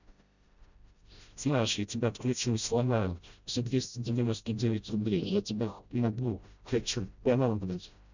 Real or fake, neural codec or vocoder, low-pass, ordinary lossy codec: fake; codec, 16 kHz, 0.5 kbps, FreqCodec, smaller model; 7.2 kHz; none